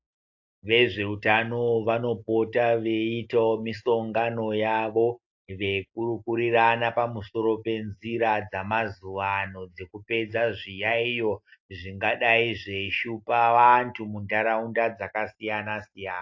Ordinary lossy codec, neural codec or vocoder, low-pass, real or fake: AAC, 48 kbps; none; 7.2 kHz; real